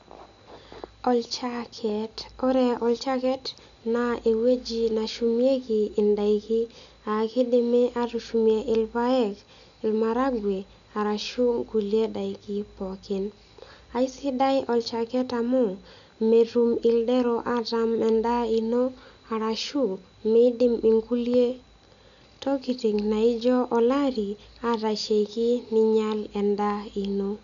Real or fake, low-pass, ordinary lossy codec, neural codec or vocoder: real; 7.2 kHz; none; none